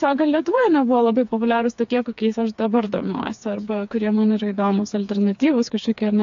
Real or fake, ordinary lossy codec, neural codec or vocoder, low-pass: fake; AAC, 64 kbps; codec, 16 kHz, 4 kbps, FreqCodec, smaller model; 7.2 kHz